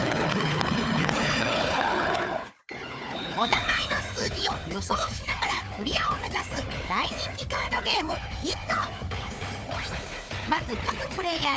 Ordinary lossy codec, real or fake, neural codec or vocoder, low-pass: none; fake; codec, 16 kHz, 4 kbps, FunCodec, trained on Chinese and English, 50 frames a second; none